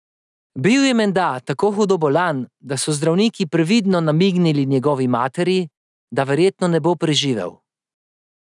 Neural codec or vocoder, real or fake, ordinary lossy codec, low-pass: none; real; none; 10.8 kHz